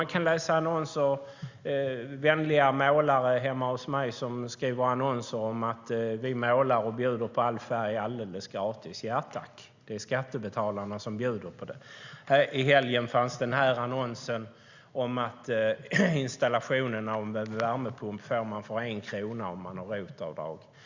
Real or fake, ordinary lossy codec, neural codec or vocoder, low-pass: real; Opus, 64 kbps; none; 7.2 kHz